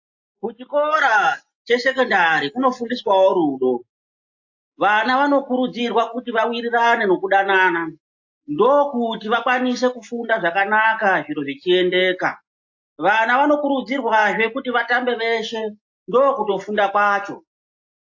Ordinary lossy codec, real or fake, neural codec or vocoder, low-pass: AAC, 48 kbps; real; none; 7.2 kHz